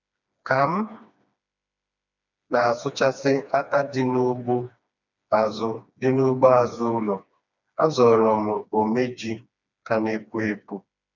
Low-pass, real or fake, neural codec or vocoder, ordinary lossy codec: 7.2 kHz; fake; codec, 16 kHz, 2 kbps, FreqCodec, smaller model; none